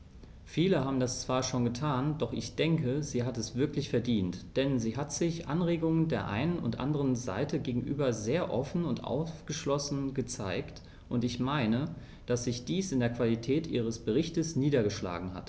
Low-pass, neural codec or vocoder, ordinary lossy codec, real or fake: none; none; none; real